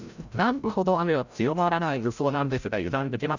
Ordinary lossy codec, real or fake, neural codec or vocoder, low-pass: none; fake; codec, 16 kHz, 0.5 kbps, FreqCodec, larger model; 7.2 kHz